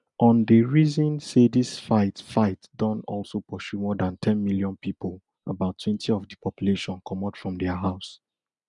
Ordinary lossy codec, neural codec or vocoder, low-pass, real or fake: none; none; 10.8 kHz; real